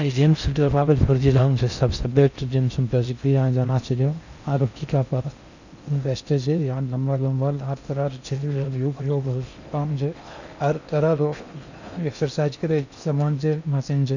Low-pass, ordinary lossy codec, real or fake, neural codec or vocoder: 7.2 kHz; none; fake; codec, 16 kHz in and 24 kHz out, 0.6 kbps, FocalCodec, streaming, 4096 codes